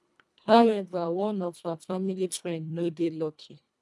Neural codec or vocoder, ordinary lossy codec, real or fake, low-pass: codec, 24 kHz, 1.5 kbps, HILCodec; none; fake; none